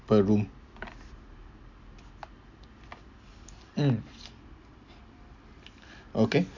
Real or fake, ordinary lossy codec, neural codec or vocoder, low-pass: real; none; none; 7.2 kHz